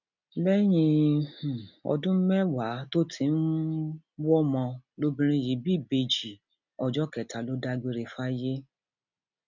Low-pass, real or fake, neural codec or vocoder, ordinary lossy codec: 7.2 kHz; real; none; none